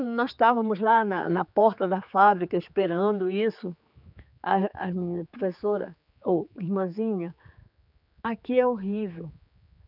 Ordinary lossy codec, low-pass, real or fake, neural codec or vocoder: none; 5.4 kHz; fake; codec, 16 kHz, 4 kbps, X-Codec, HuBERT features, trained on general audio